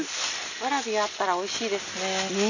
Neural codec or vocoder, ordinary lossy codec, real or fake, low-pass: none; none; real; 7.2 kHz